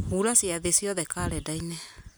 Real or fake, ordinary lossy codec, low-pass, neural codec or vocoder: real; none; none; none